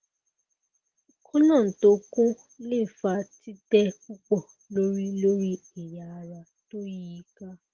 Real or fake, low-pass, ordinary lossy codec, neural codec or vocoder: real; 7.2 kHz; Opus, 16 kbps; none